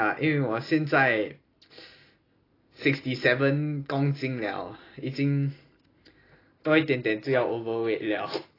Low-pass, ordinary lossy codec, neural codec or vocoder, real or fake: 5.4 kHz; AAC, 24 kbps; none; real